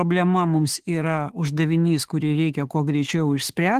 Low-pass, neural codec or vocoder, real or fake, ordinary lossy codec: 14.4 kHz; autoencoder, 48 kHz, 32 numbers a frame, DAC-VAE, trained on Japanese speech; fake; Opus, 16 kbps